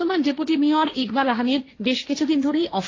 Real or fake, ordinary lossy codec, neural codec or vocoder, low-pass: fake; AAC, 32 kbps; codec, 16 kHz, 1.1 kbps, Voila-Tokenizer; 7.2 kHz